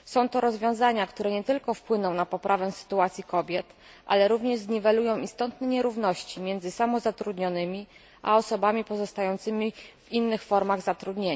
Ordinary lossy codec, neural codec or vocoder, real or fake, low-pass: none; none; real; none